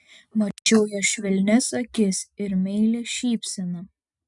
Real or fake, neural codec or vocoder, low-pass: real; none; 10.8 kHz